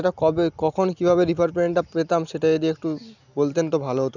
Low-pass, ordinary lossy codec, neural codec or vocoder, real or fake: 7.2 kHz; none; none; real